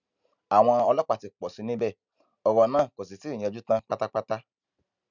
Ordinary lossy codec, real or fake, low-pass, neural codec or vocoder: none; real; 7.2 kHz; none